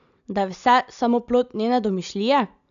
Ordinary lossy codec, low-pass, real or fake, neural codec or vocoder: none; 7.2 kHz; real; none